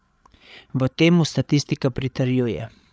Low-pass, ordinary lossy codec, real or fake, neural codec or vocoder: none; none; fake; codec, 16 kHz, 8 kbps, FreqCodec, larger model